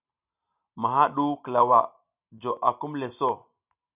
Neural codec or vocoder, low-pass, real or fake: none; 3.6 kHz; real